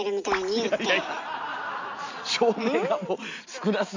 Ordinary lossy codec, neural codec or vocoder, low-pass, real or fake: AAC, 48 kbps; none; 7.2 kHz; real